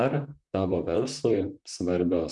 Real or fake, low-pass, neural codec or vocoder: fake; 10.8 kHz; vocoder, 44.1 kHz, 128 mel bands, Pupu-Vocoder